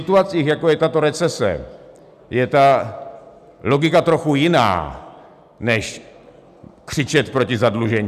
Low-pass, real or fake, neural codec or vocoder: 14.4 kHz; real; none